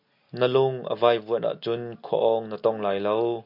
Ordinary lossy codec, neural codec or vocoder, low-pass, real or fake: MP3, 48 kbps; none; 5.4 kHz; real